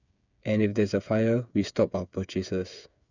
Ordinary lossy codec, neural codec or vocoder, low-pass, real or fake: none; codec, 16 kHz, 8 kbps, FreqCodec, smaller model; 7.2 kHz; fake